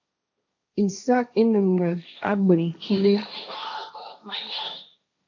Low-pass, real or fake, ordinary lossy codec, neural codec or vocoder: 7.2 kHz; fake; AAC, 48 kbps; codec, 16 kHz, 1.1 kbps, Voila-Tokenizer